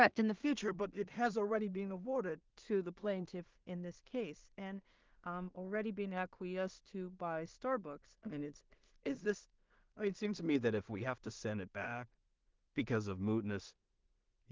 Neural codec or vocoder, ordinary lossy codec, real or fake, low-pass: codec, 16 kHz in and 24 kHz out, 0.4 kbps, LongCat-Audio-Codec, two codebook decoder; Opus, 32 kbps; fake; 7.2 kHz